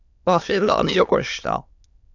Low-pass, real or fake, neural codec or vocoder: 7.2 kHz; fake; autoencoder, 22.05 kHz, a latent of 192 numbers a frame, VITS, trained on many speakers